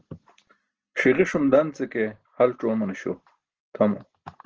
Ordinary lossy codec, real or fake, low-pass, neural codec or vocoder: Opus, 16 kbps; real; 7.2 kHz; none